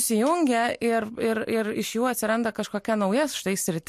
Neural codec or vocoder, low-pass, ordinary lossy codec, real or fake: none; 14.4 kHz; MP3, 64 kbps; real